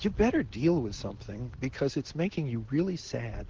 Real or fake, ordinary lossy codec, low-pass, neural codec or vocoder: real; Opus, 16 kbps; 7.2 kHz; none